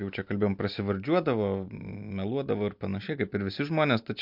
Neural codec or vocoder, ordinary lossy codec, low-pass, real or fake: none; MP3, 48 kbps; 5.4 kHz; real